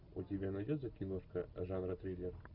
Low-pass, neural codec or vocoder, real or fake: 5.4 kHz; none; real